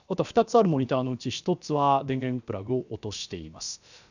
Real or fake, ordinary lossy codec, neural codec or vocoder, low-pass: fake; none; codec, 16 kHz, about 1 kbps, DyCAST, with the encoder's durations; 7.2 kHz